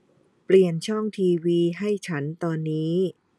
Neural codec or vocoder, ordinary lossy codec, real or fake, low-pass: none; none; real; none